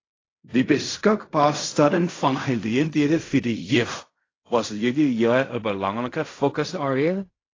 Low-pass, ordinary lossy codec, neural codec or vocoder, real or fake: 7.2 kHz; AAC, 32 kbps; codec, 16 kHz in and 24 kHz out, 0.4 kbps, LongCat-Audio-Codec, fine tuned four codebook decoder; fake